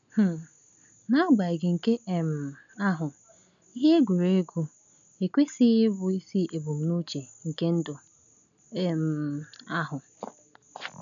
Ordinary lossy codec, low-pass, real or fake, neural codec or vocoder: none; 7.2 kHz; real; none